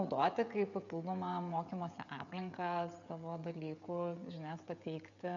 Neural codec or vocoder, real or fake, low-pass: codec, 16 kHz, 16 kbps, FreqCodec, smaller model; fake; 7.2 kHz